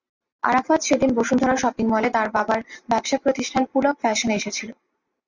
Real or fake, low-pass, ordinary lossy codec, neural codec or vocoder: real; 7.2 kHz; Opus, 64 kbps; none